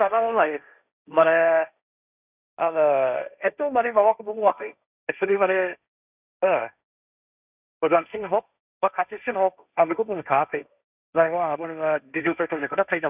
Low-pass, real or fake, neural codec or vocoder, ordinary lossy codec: 3.6 kHz; fake; codec, 16 kHz, 1.1 kbps, Voila-Tokenizer; none